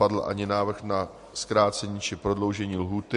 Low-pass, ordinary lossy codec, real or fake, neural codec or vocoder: 14.4 kHz; MP3, 48 kbps; real; none